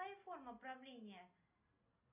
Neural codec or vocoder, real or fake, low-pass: none; real; 3.6 kHz